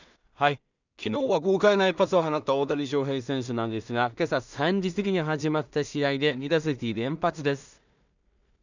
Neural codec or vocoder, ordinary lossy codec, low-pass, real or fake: codec, 16 kHz in and 24 kHz out, 0.4 kbps, LongCat-Audio-Codec, two codebook decoder; none; 7.2 kHz; fake